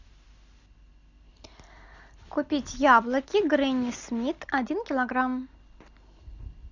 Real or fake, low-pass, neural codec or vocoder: real; 7.2 kHz; none